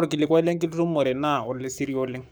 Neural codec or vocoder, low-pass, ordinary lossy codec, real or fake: codec, 44.1 kHz, 7.8 kbps, Pupu-Codec; none; none; fake